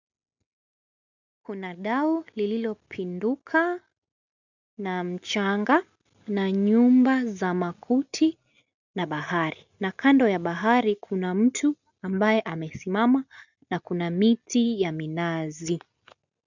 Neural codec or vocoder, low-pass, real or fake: none; 7.2 kHz; real